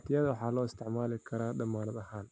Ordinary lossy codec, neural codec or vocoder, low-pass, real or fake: none; none; none; real